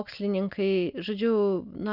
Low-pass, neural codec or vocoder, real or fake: 5.4 kHz; none; real